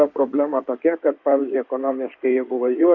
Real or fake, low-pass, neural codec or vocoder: fake; 7.2 kHz; codec, 16 kHz in and 24 kHz out, 2.2 kbps, FireRedTTS-2 codec